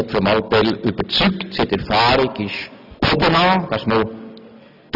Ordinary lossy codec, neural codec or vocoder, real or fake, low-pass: none; none; real; 5.4 kHz